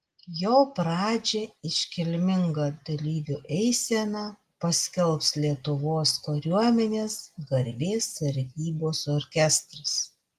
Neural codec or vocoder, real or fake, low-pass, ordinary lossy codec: none; real; 14.4 kHz; Opus, 24 kbps